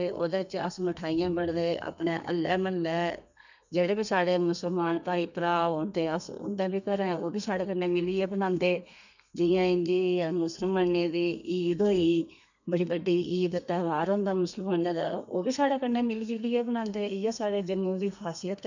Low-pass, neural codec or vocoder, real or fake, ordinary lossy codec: 7.2 kHz; codec, 32 kHz, 1.9 kbps, SNAC; fake; none